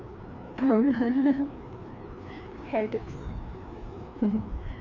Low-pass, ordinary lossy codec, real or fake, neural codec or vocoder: 7.2 kHz; none; fake; codec, 16 kHz, 2 kbps, FreqCodec, larger model